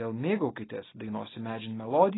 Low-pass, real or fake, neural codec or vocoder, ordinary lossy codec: 7.2 kHz; real; none; AAC, 16 kbps